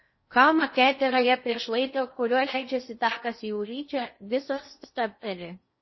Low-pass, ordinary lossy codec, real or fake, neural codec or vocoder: 7.2 kHz; MP3, 24 kbps; fake; codec, 16 kHz in and 24 kHz out, 0.6 kbps, FocalCodec, streaming, 2048 codes